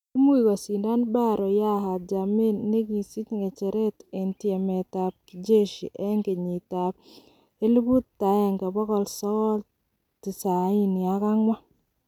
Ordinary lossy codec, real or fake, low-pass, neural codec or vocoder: none; real; 19.8 kHz; none